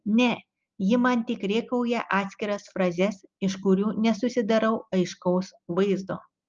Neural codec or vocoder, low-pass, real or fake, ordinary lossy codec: none; 7.2 kHz; real; Opus, 24 kbps